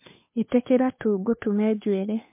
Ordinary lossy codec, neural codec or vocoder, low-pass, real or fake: MP3, 24 kbps; codec, 16 kHz, 2 kbps, FunCodec, trained on Chinese and English, 25 frames a second; 3.6 kHz; fake